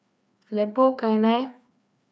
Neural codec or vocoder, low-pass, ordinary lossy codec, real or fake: codec, 16 kHz, 2 kbps, FreqCodec, larger model; none; none; fake